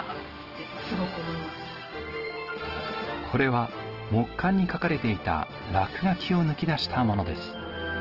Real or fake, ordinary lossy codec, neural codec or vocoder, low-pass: real; Opus, 16 kbps; none; 5.4 kHz